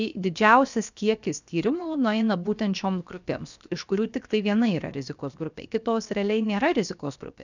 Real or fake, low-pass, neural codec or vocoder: fake; 7.2 kHz; codec, 16 kHz, 0.7 kbps, FocalCodec